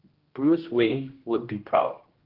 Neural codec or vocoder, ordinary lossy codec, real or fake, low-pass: codec, 16 kHz, 1 kbps, X-Codec, HuBERT features, trained on general audio; Opus, 16 kbps; fake; 5.4 kHz